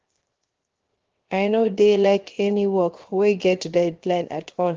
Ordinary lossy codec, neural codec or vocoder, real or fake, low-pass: Opus, 16 kbps; codec, 16 kHz, 0.7 kbps, FocalCodec; fake; 7.2 kHz